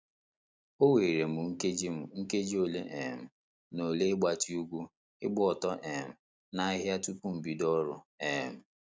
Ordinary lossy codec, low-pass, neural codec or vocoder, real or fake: none; none; none; real